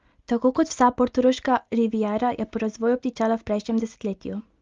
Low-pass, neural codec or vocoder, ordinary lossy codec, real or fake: 7.2 kHz; none; Opus, 32 kbps; real